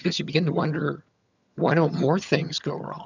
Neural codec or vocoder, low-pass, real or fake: vocoder, 22.05 kHz, 80 mel bands, HiFi-GAN; 7.2 kHz; fake